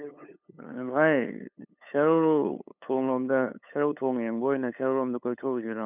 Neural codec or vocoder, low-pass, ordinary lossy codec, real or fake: codec, 16 kHz, 8 kbps, FunCodec, trained on LibriTTS, 25 frames a second; 3.6 kHz; none; fake